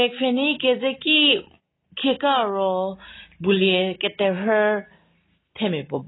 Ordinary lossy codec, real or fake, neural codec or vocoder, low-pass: AAC, 16 kbps; real; none; 7.2 kHz